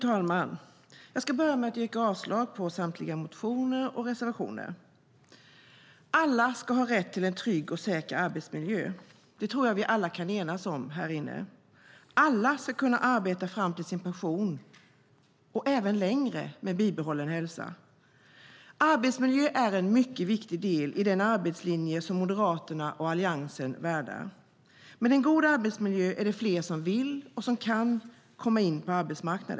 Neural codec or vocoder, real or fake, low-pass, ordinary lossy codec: none; real; none; none